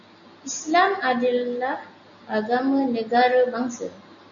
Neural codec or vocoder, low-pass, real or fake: none; 7.2 kHz; real